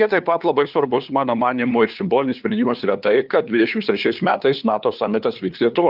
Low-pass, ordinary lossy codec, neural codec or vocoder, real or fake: 5.4 kHz; Opus, 32 kbps; codec, 16 kHz, 2 kbps, FunCodec, trained on LibriTTS, 25 frames a second; fake